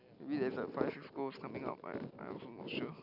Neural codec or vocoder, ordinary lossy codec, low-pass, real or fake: none; none; 5.4 kHz; real